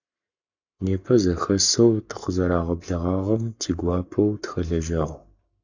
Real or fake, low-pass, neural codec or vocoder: fake; 7.2 kHz; codec, 44.1 kHz, 7.8 kbps, Pupu-Codec